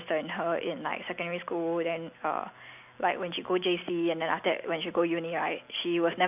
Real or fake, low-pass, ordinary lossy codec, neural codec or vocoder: real; 3.6 kHz; none; none